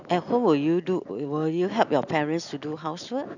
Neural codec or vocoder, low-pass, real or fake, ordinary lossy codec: none; 7.2 kHz; real; none